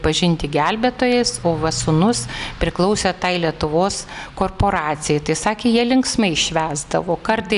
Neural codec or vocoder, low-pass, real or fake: none; 10.8 kHz; real